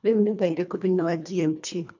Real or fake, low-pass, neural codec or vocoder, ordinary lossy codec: fake; 7.2 kHz; codec, 24 kHz, 1.5 kbps, HILCodec; none